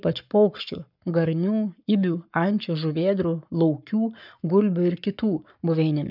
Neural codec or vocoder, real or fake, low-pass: codec, 44.1 kHz, 7.8 kbps, Pupu-Codec; fake; 5.4 kHz